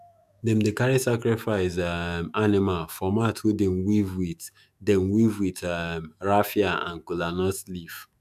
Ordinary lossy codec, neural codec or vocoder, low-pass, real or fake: none; autoencoder, 48 kHz, 128 numbers a frame, DAC-VAE, trained on Japanese speech; 14.4 kHz; fake